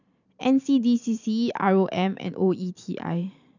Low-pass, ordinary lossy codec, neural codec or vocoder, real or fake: 7.2 kHz; none; vocoder, 44.1 kHz, 128 mel bands every 512 samples, BigVGAN v2; fake